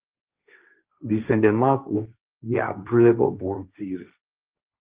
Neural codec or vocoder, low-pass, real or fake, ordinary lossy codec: codec, 16 kHz, 1.1 kbps, Voila-Tokenizer; 3.6 kHz; fake; Opus, 24 kbps